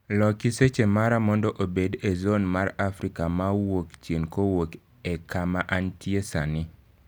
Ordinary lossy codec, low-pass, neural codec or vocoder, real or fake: none; none; none; real